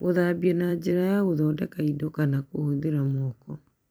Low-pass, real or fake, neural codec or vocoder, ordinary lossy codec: none; fake; vocoder, 44.1 kHz, 128 mel bands every 256 samples, BigVGAN v2; none